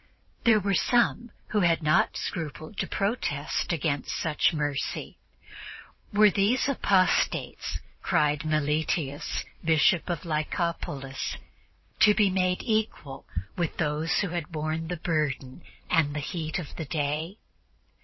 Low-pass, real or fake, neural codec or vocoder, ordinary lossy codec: 7.2 kHz; fake; vocoder, 44.1 kHz, 128 mel bands every 512 samples, BigVGAN v2; MP3, 24 kbps